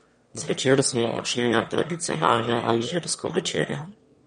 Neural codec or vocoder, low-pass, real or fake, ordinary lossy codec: autoencoder, 22.05 kHz, a latent of 192 numbers a frame, VITS, trained on one speaker; 9.9 kHz; fake; MP3, 48 kbps